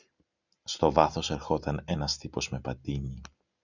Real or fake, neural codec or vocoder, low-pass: real; none; 7.2 kHz